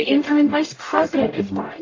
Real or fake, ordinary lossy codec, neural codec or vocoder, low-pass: fake; AAC, 32 kbps; codec, 44.1 kHz, 0.9 kbps, DAC; 7.2 kHz